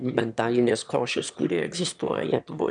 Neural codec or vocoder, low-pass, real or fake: autoencoder, 22.05 kHz, a latent of 192 numbers a frame, VITS, trained on one speaker; 9.9 kHz; fake